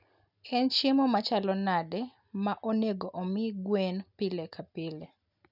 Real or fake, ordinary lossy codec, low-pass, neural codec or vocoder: real; none; 5.4 kHz; none